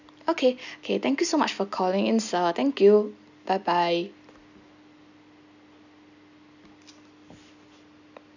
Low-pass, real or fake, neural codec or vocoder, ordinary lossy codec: 7.2 kHz; real; none; none